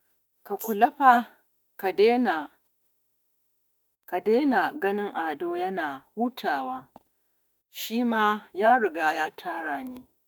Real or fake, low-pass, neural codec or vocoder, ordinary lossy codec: fake; none; autoencoder, 48 kHz, 32 numbers a frame, DAC-VAE, trained on Japanese speech; none